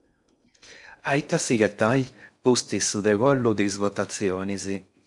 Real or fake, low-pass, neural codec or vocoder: fake; 10.8 kHz; codec, 16 kHz in and 24 kHz out, 0.6 kbps, FocalCodec, streaming, 4096 codes